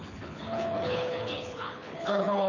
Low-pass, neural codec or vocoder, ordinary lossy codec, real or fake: 7.2 kHz; codec, 24 kHz, 6 kbps, HILCodec; none; fake